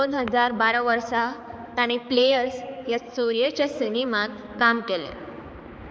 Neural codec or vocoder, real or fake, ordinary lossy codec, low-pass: codec, 16 kHz, 4 kbps, X-Codec, HuBERT features, trained on balanced general audio; fake; none; none